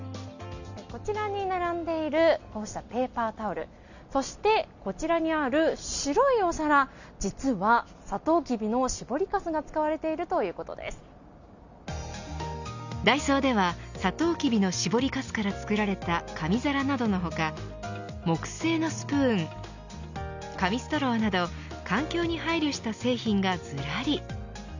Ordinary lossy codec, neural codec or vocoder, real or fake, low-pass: none; none; real; 7.2 kHz